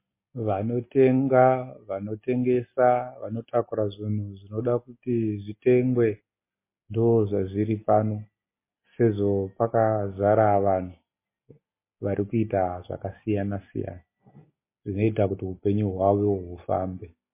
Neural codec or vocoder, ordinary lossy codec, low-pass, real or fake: none; MP3, 24 kbps; 3.6 kHz; real